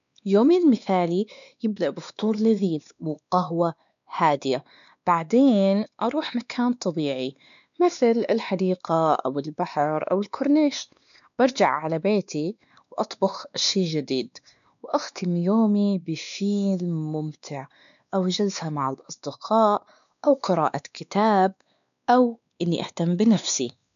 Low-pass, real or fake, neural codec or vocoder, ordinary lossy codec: 7.2 kHz; fake; codec, 16 kHz, 2 kbps, X-Codec, WavLM features, trained on Multilingual LibriSpeech; none